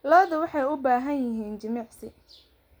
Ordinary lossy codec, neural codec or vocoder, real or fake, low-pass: none; none; real; none